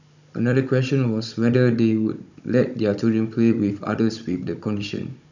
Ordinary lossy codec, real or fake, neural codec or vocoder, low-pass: none; fake; codec, 16 kHz, 16 kbps, FunCodec, trained on Chinese and English, 50 frames a second; 7.2 kHz